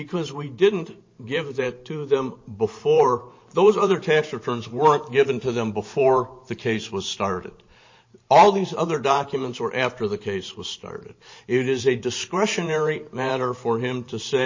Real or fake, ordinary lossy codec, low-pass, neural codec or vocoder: fake; MP3, 32 kbps; 7.2 kHz; vocoder, 44.1 kHz, 128 mel bands every 512 samples, BigVGAN v2